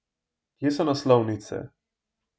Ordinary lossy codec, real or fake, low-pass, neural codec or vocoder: none; real; none; none